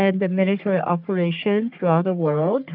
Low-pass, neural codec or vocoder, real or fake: 5.4 kHz; codec, 44.1 kHz, 3.4 kbps, Pupu-Codec; fake